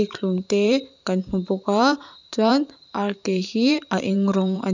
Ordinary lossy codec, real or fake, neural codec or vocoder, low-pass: none; fake; vocoder, 44.1 kHz, 128 mel bands every 512 samples, BigVGAN v2; 7.2 kHz